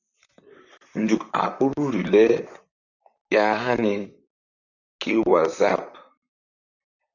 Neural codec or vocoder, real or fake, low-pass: vocoder, 44.1 kHz, 128 mel bands, Pupu-Vocoder; fake; 7.2 kHz